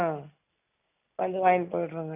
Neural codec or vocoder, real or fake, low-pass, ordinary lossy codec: none; real; 3.6 kHz; none